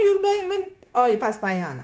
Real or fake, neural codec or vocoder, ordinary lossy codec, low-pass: fake; codec, 16 kHz, 0.9 kbps, LongCat-Audio-Codec; none; none